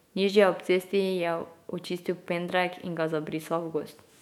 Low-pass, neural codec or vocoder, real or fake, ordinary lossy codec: 19.8 kHz; autoencoder, 48 kHz, 128 numbers a frame, DAC-VAE, trained on Japanese speech; fake; MP3, 96 kbps